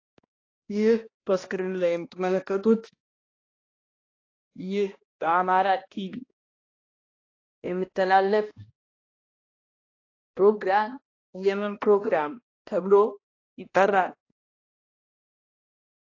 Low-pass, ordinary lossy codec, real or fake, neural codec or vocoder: 7.2 kHz; AAC, 32 kbps; fake; codec, 16 kHz, 1 kbps, X-Codec, HuBERT features, trained on balanced general audio